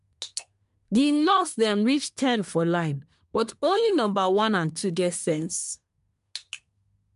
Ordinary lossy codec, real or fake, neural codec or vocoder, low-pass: MP3, 64 kbps; fake; codec, 24 kHz, 1 kbps, SNAC; 10.8 kHz